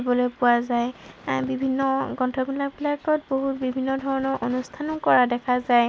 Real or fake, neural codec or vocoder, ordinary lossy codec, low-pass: real; none; none; none